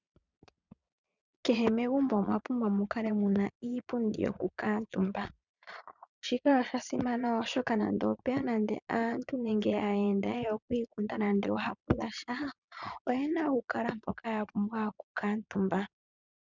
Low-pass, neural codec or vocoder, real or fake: 7.2 kHz; vocoder, 22.05 kHz, 80 mel bands, Vocos; fake